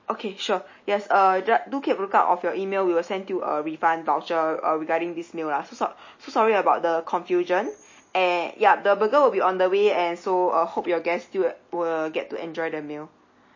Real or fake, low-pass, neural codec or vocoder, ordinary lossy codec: real; 7.2 kHz; none; MP3, 32 kbps